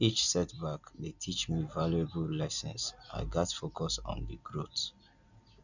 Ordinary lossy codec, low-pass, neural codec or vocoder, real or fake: none; 7.2 kHz; none; real